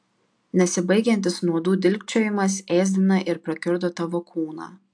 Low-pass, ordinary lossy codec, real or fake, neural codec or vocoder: 9.9 kHz; AAC, 64 kbps; real; none